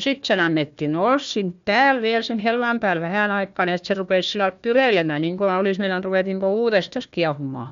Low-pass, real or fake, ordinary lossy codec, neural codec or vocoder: 7.2 kHz; fake; MP3, 64 kbps; codec, 16 kHz, 1 kbps, FunCodec, trained on Chinese and English, 50 frames a second